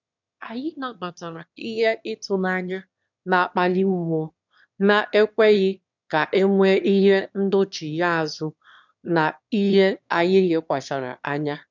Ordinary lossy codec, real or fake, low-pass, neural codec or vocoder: none; fake; 7.2 kHz; autoencoder, 22.05 kHz, a latent of 192 numbers a frame, VITS, trained on one speaker